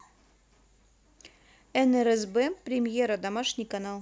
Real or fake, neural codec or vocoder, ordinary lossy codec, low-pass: real; none; none; none